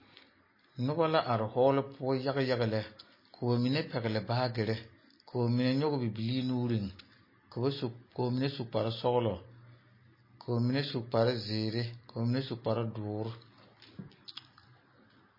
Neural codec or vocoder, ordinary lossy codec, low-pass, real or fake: none; MP3, 24 kbps; 5.4 kHz; real